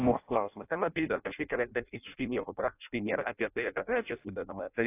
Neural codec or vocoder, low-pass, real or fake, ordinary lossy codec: codec, 16 kHz in and 24 kHz out, 0.6 kbps, FireRedTTS-2 codec; 3.6 kHz; fake; AAC, 24 kbps